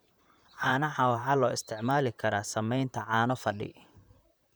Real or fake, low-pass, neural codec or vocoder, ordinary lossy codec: fake; none; vocoder, 44.1 kHz, 128 mel bands, Pupu-Vocoder; none